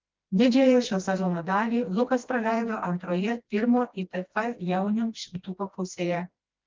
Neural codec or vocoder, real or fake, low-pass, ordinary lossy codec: codec, 16 kHz, 1 kbps, FreqCodec, smaller model; fake; 7.2 kHz; Opus, 24 kbps